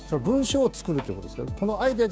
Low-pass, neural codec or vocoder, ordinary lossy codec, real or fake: none; codec, 16 kHz, 6 kbps, DAC; none; fake